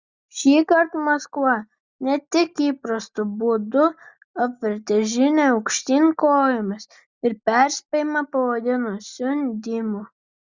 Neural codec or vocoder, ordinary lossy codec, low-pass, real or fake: none; Opus, 64 kbps; 7.2 kHz; real